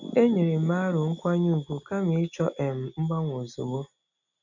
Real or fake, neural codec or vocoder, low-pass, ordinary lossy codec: real; none; 7.2 kHz; none